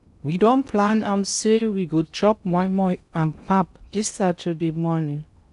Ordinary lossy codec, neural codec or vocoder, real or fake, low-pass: none; codec, 16 kHz in and 24 kHz out, 0.6 kbps, FocalCodec, streaming, 2048 codes; fake; 10.8 kHz